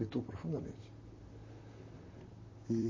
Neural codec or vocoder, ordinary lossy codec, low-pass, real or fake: vocoder, 44.1 kHz, 128 mel bands, Pupu-Vocoder; MP3, 32 kbps; 7.2 kHz; fake